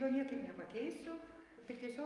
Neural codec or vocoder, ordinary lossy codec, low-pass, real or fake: codec, 44.1 kHz, 7.8 kbps, DAC; Opus, 32 kbps; 10.8 kHz; fake